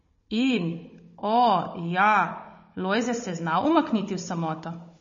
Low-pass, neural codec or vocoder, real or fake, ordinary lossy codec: 7.2 kHz; codec, 16 kHz, 16 kbps, FunCodec, trained on Chinese and English, 50 frames a second; fake; MP3, 32 kbps